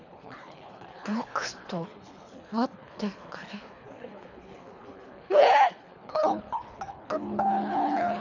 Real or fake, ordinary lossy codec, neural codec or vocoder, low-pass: fake; none; codec, 24 kHz, 3 kbps, HILCodec; 7.2 kHz